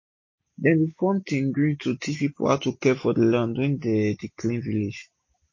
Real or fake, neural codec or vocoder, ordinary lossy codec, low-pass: real; none; MP3, 32 kbps; 7.2 kHz